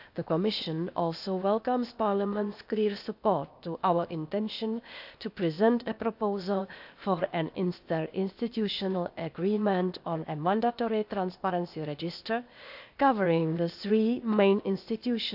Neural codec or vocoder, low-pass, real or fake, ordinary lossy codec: codec, 16 kHz, 0.8 kbps, ZipCodec; 5.4 kHz; fake; none